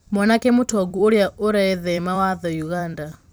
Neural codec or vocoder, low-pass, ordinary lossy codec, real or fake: vocoder, 44.1 kHz, 128 mel bands every 256 samples, BigVGAN v2; none; none; fake